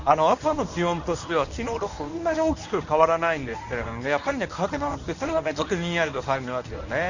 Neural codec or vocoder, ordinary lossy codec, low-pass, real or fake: codec, 24 kHz, 0.9 kbps, WavTokenizer, medium speech release version 1; none; 7.2 kHz; fake